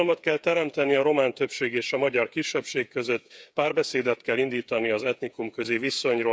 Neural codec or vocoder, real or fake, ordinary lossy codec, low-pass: codec, 16 kHz, 8 kbps, FreqCodec, smaller model; fake; none; none